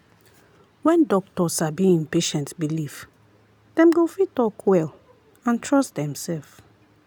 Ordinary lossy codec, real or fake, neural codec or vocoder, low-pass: none; real; none; 19.8 kHz